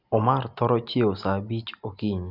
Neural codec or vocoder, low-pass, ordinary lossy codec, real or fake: none; 5.4 kHz; Opus, 64 kbps; real